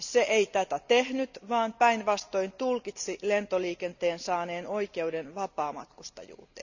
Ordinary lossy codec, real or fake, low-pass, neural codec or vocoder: none; real; 7.2 kHz; none